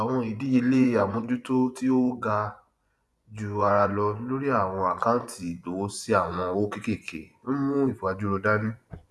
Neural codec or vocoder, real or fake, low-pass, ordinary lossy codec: vocoder, 24 kHz, 100 mel bands, Vocos; fake; none; none